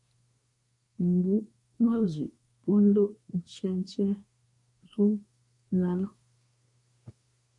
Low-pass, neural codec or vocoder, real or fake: 10.8 kHz; codec, 24 kHz, 1 kbps, SNAC; fake